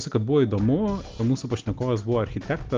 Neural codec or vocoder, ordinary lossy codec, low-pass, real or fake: none; Opus, 24 kbps; 7.2 kHz; real